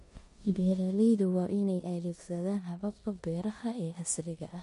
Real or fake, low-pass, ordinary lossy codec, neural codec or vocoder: fake; 10.8 kHz; MP3, 48 kbps; codec, 16 kHz in and 24 kHz out, 0.9 kbps, LongCat-Audio-Codec, four codebook decoder